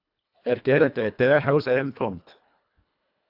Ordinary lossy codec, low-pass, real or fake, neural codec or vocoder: AAC, 48 kbps; 5.4 kHz; fake; codec, 24 kHz, 1.5 kbps, HILCodec